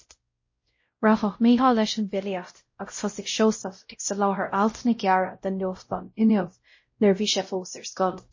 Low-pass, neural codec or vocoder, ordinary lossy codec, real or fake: 7.2 kHz; codec, 16 kHz, 0.5 kbps, X-Codec, WavLM features, trained on Multilingual LibriSpeech; MP3, 32 kbps; fake